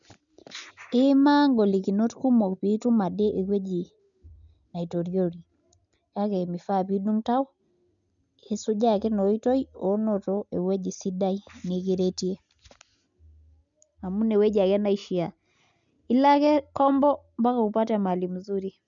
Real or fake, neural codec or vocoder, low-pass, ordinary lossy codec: real; none; 7.2 kHz; none